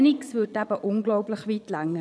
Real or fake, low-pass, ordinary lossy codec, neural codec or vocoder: fake; none; none; vocoder, 22.05 kHz, 80 mel bands, Vocos